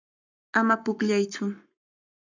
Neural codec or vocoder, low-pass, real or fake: codec, 44.1 kHz, 7.8 kbps, Pupu-Codec; 7.2 kHz; fake